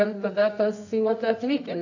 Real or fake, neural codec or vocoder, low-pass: fake; codec, 24 kHz, 0.9 kbps, WavTokenizer, medium music audio release; 7.2 kHz